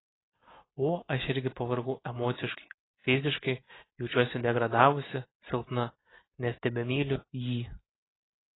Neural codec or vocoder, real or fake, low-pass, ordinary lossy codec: none; real; 7.2 kHz; AAC, 16 kbps